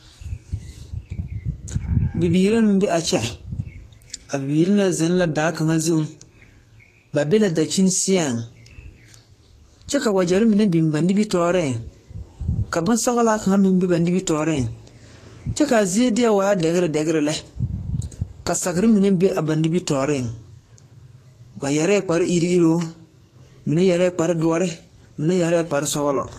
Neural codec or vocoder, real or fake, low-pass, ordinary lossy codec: codec, 44.1 kHz, 2.6 kbps, SNAC; fake; 14.4 kHz; AAC, 48 kbps